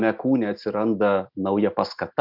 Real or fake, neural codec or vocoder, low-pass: real; none; 5.4 kHz